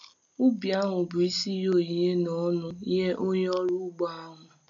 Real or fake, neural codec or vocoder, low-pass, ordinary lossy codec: real; none; 7.2 kHz; none